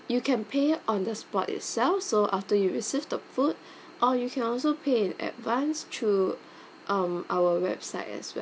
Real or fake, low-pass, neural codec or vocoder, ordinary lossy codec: real; none; none; none